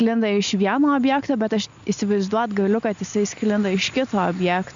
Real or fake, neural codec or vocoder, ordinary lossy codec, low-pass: real; none; MP3, 64 kbps; 7.2 kHz